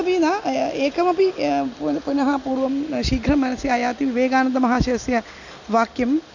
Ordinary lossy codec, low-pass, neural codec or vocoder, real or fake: none; 7.2 kHz; none; real